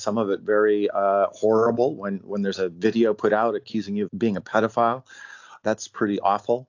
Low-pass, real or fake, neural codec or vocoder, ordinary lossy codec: 7.2 kHz; real; none; AAC, 48 kbps